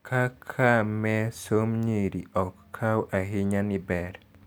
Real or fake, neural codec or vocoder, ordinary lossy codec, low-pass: real; none; none; none